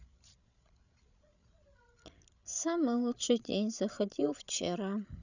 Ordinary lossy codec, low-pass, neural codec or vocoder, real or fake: none; 7.2 kHz; codec, 16 kHz, 16 kbps, FreqCodec, larger model; fake